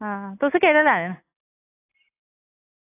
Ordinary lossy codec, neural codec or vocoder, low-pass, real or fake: AAC, 16 kbps; none; 3.6 kHz; real